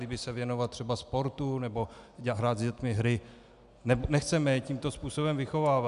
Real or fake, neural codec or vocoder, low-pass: real; none; 10.8 kHz